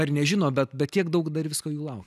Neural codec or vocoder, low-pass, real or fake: none; 14.4 kHz; real